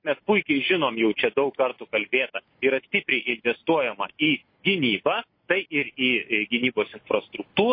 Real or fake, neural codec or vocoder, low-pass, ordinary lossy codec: real; none; 5.4 kHz; MP3, 24 kbps